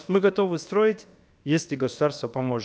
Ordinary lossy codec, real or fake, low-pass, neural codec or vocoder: none; fake; none; codec, 16 kHz, about 1 kbps, DyCAST, with the encoder's durations